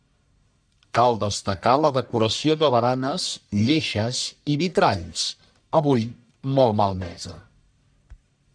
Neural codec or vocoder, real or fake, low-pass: codec, 44.1 kHz, 1.7 kbps, Pupu-Codec; fake; 9.9 kHz